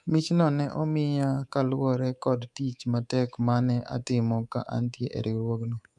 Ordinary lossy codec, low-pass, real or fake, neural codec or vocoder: none; none; fake; codec, 24 kHz, 3.1 kbps, DualCodec